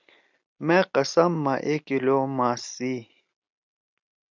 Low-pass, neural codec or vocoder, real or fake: 7.2 kHz; none; real